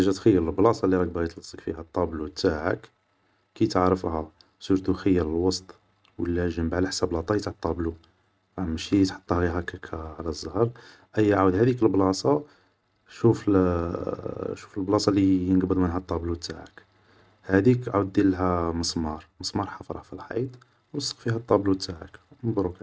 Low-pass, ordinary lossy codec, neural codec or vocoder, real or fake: none; none; none; real